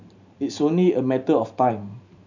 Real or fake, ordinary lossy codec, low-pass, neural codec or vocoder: fake; none; 7.2 kHz; vocoder, 44.1 kHz, 128 mel bands every 512 samples, BigVGAN v2